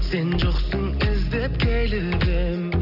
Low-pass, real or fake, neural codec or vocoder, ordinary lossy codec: 5.4 kHz; real; none; none